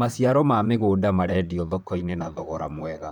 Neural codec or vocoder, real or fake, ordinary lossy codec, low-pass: vocoder, 44.1 kHz, 128 mel bands, Pupu-Vocoder; fake; none; 19.8 kHz